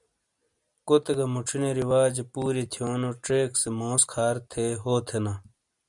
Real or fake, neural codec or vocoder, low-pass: real; none; 10.8 kHz